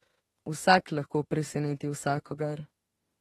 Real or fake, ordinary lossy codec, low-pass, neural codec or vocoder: fake; AAC, 32 kbps; 19.8 kHz; autoencoder, 48 kHz, 32 numbers a frame, DAC-VAE, trained on Japanese speech